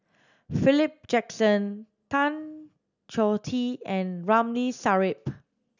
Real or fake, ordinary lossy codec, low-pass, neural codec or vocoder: real; none; 7.2 kHz; none